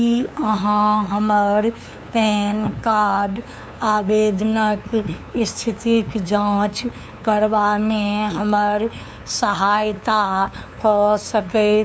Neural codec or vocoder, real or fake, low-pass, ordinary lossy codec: codec, 16 kHz, 2 kbps, FunCodec, trained on LibriTTS, 25 frames a second; fake; none; none